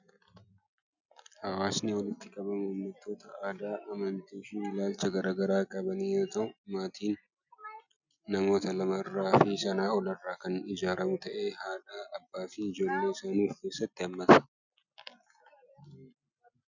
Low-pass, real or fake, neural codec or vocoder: 7.2 kHz; real; none